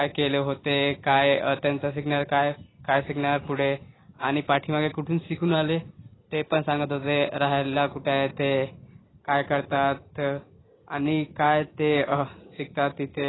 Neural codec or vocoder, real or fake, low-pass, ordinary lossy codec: none; real; 7.2 kHz; AAC, 16 kbps